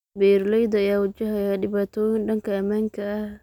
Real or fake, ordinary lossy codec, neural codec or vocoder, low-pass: real; none; none; 19.8 kHz